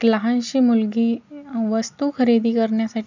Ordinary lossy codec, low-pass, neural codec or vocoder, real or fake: none; 7.2 kHz; none; real